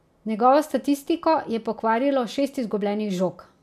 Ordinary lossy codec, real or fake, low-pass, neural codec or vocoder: none; real; 14.4 kHz; none